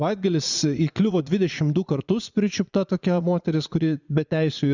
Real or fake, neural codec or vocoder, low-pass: fake; vocoder, 44.1 kHz, 80 mel bands, Vocos; 7.2 kHz